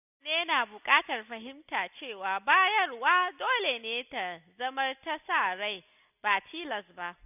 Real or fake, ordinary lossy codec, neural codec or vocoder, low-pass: real; none; none; 3.6 kHz